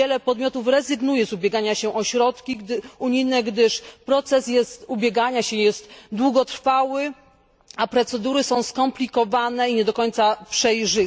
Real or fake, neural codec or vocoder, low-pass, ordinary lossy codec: real; none; none; none